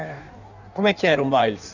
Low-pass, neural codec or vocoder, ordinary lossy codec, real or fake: 7.2 kHz; codec, 16 kHz in and 24 kHz out, 1.1 kbps, FireRedTTS-2 codec; none; fake